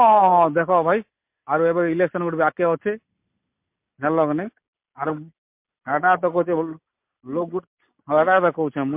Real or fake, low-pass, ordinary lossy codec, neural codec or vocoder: real; 3.6 kHz; MP3, 32 kbps; none